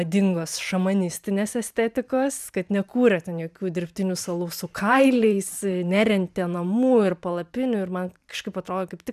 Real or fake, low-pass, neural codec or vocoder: fake; 14.4 kHz; vocoder, 44.1 kHz, 128 mel bands every 512 samples, BigVGAN v2